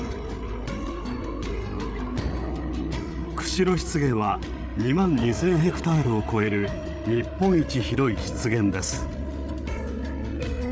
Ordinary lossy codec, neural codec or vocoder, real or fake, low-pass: none; codec, 16 kHz, 8 kbps, FreqCodec, larger model; fake; none